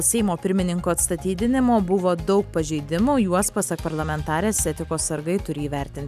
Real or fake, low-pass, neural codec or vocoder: real; 14.4 kHz; none